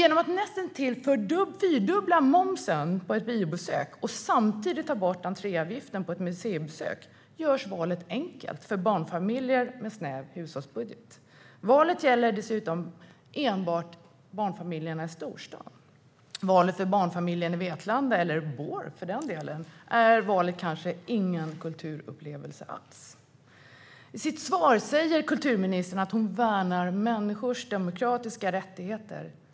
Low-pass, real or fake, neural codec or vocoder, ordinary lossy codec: none; real; none; none